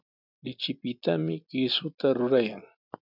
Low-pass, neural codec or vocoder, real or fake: 5.4 kHz; none; real